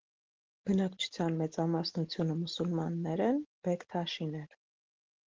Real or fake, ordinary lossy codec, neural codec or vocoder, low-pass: real; Opus, 16 kbps; none; 7.2 kHz